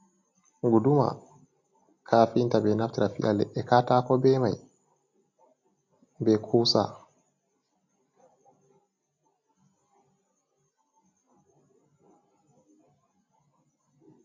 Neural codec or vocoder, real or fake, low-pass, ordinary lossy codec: none; real; 7.2 kHz; MP3, 48 kbps